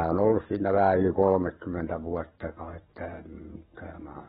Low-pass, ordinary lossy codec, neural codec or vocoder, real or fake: 19.8 kHz; AAC, 16 kbps; codec, 44.1 kHz, 7.8 kbps, DAC; fake